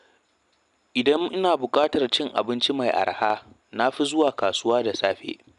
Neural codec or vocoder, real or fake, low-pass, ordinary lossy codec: none; real; 10.8 kHz; none